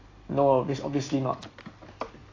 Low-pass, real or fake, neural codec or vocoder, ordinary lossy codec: 7.2 kHz; real; none; AAC, 32 kbps